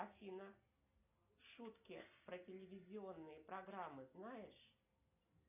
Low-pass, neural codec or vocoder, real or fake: 3.6 kHz; none; real